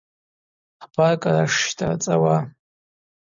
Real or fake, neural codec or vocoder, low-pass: real; none; 7.2 kHz